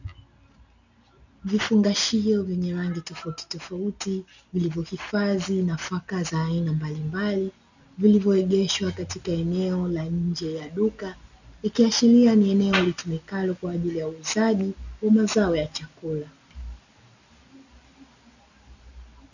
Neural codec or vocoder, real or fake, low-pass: none; real; 7.2 kHz